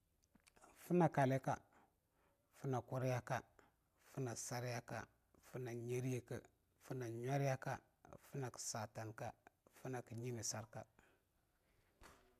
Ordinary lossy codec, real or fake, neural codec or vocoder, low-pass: none; real; none; 14.4 kHz